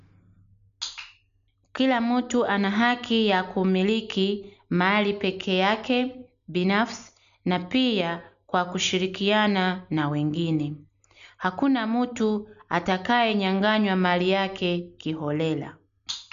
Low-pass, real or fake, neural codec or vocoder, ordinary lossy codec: 7.2 kHz; real; none; none